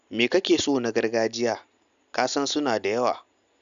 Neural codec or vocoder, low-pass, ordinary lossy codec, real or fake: none; 7.2 kHz; none; real